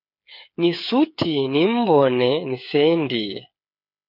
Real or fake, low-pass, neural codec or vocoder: fake; 5.4 kHz; codec, 16 kHz, 8 kbps, FreqCodec, smaller model